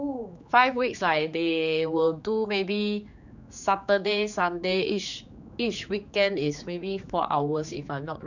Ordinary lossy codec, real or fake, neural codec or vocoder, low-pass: none; fake; codec, 16 kHz, 4 kbps, X-Codec, HuBERT features, trained on general audio; 7.2 kHz